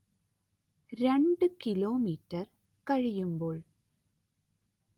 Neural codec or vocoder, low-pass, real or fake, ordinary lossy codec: none; 19.8 kHz; real; Opus, 24 kbps